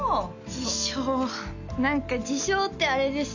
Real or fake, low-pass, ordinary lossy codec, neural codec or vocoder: real; 7.2 kHz; none; none